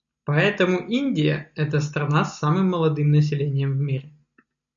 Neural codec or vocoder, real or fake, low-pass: none; real; 7.2 kHz